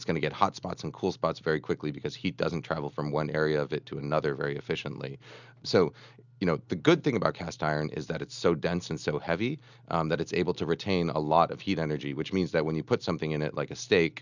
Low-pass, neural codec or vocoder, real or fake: 7.2 kHz; none; real